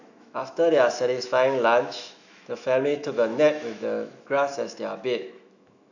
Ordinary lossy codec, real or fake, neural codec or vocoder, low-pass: none; fake; autoencoder, 48 kHz, 128 numbers a frame, DAC-VAE, trained on Japanese speech; 7.2 kHz